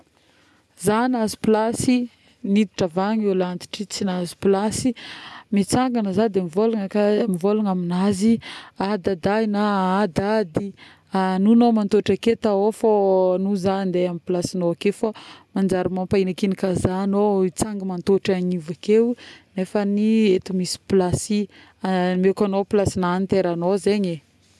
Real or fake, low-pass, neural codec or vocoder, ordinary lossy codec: real; none; none; none